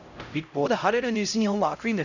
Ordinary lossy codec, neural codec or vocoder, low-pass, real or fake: none; codec, 16 kHz, 0.5 kbps, X-Codec, HuBERT features, trained on LibriSpeech; 7.2 kHz; fake